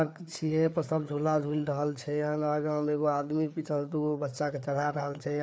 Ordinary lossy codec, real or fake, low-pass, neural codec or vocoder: none; fake; none; codec, 16 kHz, 4 kbps, FreqCodec, larger model